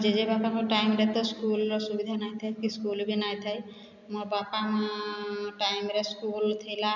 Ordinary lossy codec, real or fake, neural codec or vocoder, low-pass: none; real; none; 7.2 kHz